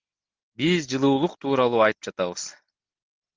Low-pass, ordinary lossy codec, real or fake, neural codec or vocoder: 7.2 kHz; Opus, 32 kbps; real; none